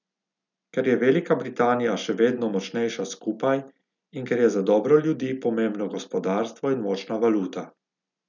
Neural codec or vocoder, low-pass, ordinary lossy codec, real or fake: none; 7.2 kHz; none; real